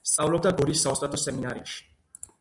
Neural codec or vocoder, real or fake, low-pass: none; real; 10.8 kHz